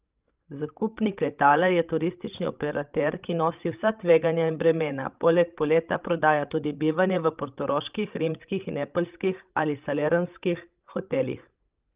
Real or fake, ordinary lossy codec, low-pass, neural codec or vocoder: fake; Opus, 24 kbps; 3.6 kHz; codec, 16 kHz, 16 kbps, FreqCodec, larger model